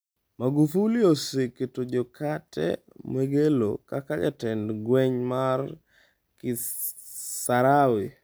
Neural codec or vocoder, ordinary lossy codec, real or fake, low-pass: none; none; real; none